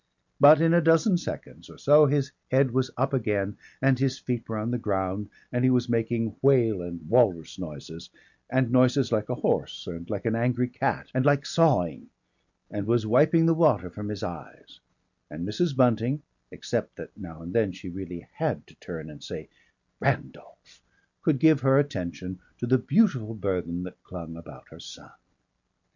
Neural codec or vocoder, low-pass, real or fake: none; 7.2 kHz; real